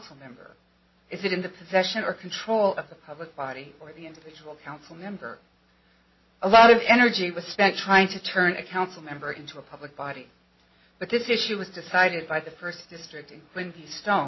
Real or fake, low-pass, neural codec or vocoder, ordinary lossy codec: real; 7.2 kHz; none; MP3, 24 kbps